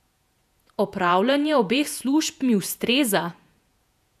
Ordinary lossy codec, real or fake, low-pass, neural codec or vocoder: none; fake; 14.4 kHz; vocoder, 48 kHz, 128 mel bands, Vocos